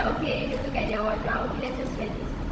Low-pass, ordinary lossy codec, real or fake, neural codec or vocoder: none; none; fake; codec, 16 kHz, 16 kbps, FunCodec, trained on Chinese and English, 50 frames a second